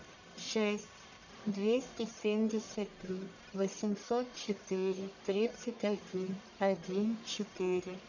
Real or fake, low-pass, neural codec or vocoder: fake; 7.2 kHz; codec, 44.1 kHz, 1.7 kbps, Pupu-Codec